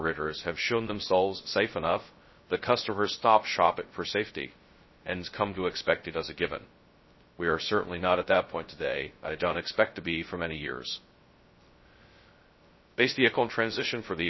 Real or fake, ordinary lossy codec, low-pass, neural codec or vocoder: fake; MP3, 24 kbps; 7.2 kHz; codec, 16 kHz, 0.2 kbps, FocalCodec